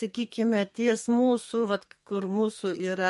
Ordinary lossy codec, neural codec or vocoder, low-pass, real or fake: MP3, 48 kbps; codec, 32 kHz, 1.9 kbps, SNAC; 14.4 kHz; fake